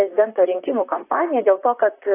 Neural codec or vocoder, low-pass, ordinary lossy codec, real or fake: vocoder, 44.1 kHz, 128 mel bands, Pupu-Vocoder; 3.6 kHz; AAC, 24 kbps; fake